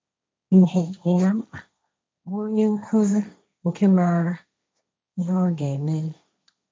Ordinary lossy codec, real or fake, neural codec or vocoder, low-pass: none; fake; codec, 16 kHz, 1.1 kbps, Voila-Tokenizer; none